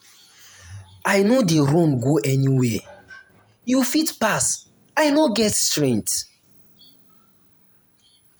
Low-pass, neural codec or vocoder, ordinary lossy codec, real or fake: none; vocoder, 48 kHz, 128 mel bands, Vocos; none; fake